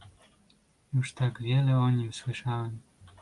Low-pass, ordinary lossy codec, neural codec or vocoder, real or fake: 10.8 kHz; Opus, 32 kbps; none; real